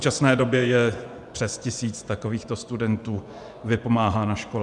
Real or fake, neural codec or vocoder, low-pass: fake; vocoder, 24 kHz, 100 mel bands, Vocos; 10.8 kHz